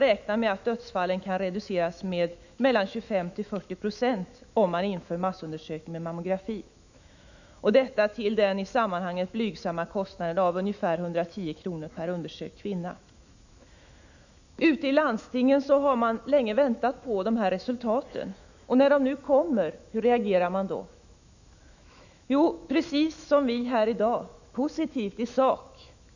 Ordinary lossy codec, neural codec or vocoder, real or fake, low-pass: none; autoencoder, 48 kHz, 128 numbers a frame, DAC-VAE, trained on Japanese speech; fake; 7.2 kHz